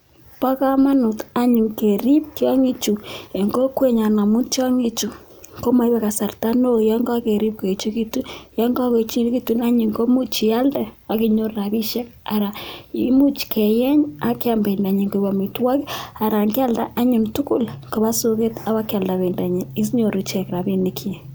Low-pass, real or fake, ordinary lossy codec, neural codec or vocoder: none; real; none; none